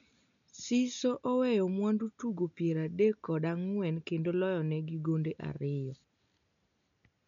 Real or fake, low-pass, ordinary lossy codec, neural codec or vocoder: real; 7.2 kHz; none; none